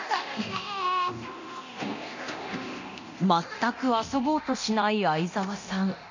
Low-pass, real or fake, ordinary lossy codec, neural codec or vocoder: 7.2 kHz; fake; none; codec, 24 kHz, 0.9 kbps, DualCodec